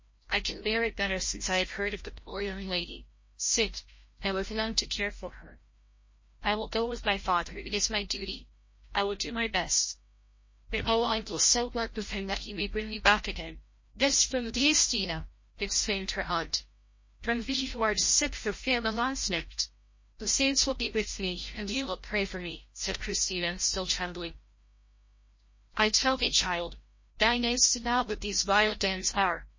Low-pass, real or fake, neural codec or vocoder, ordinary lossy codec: 7.2 kHz; fake; codec, 16 kHz, 0.5 kbps, FreqCodec, larger model; MP3, 32 kbps